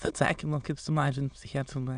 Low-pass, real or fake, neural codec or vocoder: 9.9 kHz; fake; autoencoder, 22.05 kHz, a latent of 192 numbers a frame, VITS, trained on many speakers